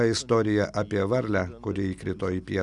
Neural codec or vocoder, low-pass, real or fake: none; 10.8 kHz; real